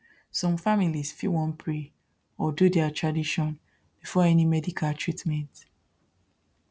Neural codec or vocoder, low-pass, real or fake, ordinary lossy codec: none; none; real; none